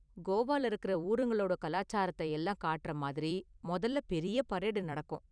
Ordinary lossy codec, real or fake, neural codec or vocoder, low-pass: none; fake; vocoder, 44.1 kHz, 128 mel bands every 256 samples, BigVGAN v2; 9.9 kHz